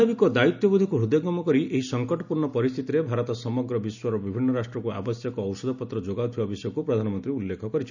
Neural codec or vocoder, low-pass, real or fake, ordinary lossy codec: none; 7.2 kHz; real; none